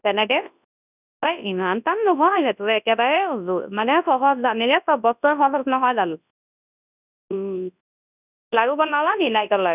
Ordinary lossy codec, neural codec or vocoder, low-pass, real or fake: none; codec, 24 kHz, 0.9 kbps, WavTokenizer, large speech release; 3.6 kHz; fake